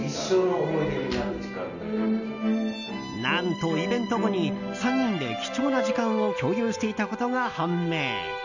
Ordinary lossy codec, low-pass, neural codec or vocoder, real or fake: none; 7.2 kHz; none; real